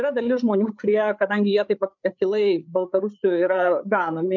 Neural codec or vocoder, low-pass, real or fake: codec, 16 kHz, 8 kbps, FreqCodec, larger model; 7.2 kHz; fake